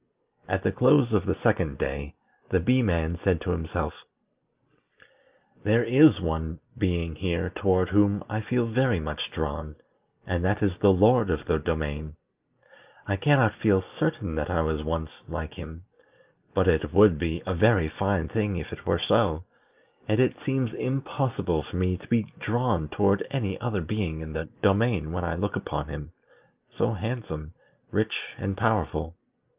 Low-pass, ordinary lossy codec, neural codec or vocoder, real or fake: 3.6 kHz; Opus, 24 kbps; none; real